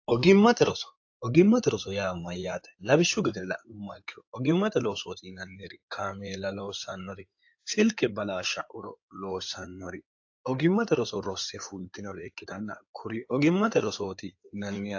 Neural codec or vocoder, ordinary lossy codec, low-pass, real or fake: codec, 16 kHz in and 24 kHz out, 2.2 kbps, FireRedTTS-2 codec; AAC, 48 kbps; 7.2 kHz; fake